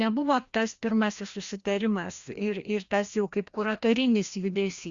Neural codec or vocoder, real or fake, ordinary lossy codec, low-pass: codec, 16 kHz, 1 kbps, FreqCodec, larger model; fake; Opus, 64 kbps; 7.2 kHz